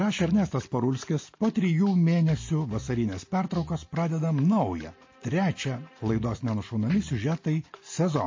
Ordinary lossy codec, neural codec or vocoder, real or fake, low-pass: MP3, 32 kbps; none; real; 7.2 kHz